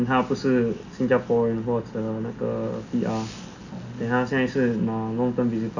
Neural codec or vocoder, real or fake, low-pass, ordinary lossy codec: none; real; 7.2 kHz; none